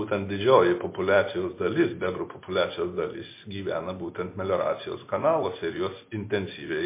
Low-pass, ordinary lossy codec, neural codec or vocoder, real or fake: 3.6 kHz; MP3, 24 kbps; none; real